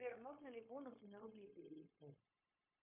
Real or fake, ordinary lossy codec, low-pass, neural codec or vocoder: fake; MP3, 24 kbps; 3.6 kHz; codec, 16 kHz, 0.9 kbps, LongCat-Audio-Codec